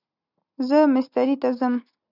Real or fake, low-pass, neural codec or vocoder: real; 5.4 kHz; none